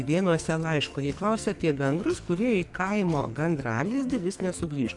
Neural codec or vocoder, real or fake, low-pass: codec, 44.1 kHz, 2.6 kbps, SNAC; fake; 10.8 kHz